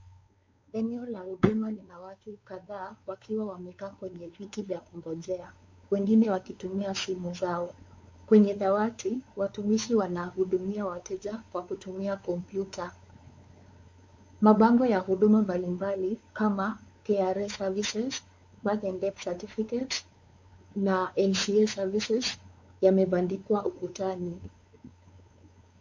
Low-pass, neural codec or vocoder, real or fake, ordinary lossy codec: 7.2 kHz; codec, 16 kHz, 4 kbps, X-Codec, WavLM features, trained on Multilingual LibriSpeech; fake; MP3, 48 kbps